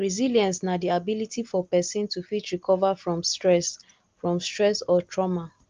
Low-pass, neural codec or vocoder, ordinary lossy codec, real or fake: 7.2 kHz; none; Opus, 32 kbps; real